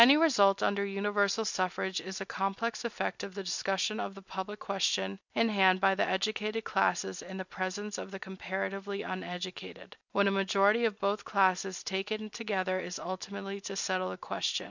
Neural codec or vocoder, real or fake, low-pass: none; real; 7.2 kHz